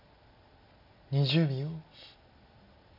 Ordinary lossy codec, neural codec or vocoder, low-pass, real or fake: AAC, 48 kbps; none; 5.4 kHz; real